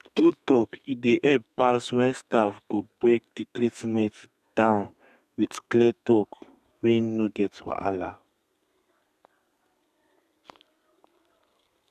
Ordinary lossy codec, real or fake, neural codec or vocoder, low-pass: none; fake; codec, 32 kHz, 1.9 kbps, SNAC; 14.4 kHz